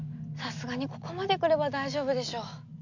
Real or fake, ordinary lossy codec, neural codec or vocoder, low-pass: real; none; none; 7.2 kHz